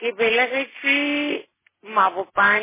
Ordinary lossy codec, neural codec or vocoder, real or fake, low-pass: MP3, 16 kbps; none; real; 3.6 kHz